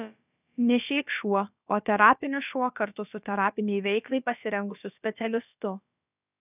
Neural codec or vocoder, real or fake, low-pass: codec, 16 kHz, about 1 kbps, DyCAST, with the encoder's durations; fake; 3.6 kHz